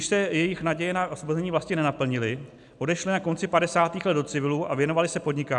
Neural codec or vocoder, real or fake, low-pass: none; real; 10.8 kHz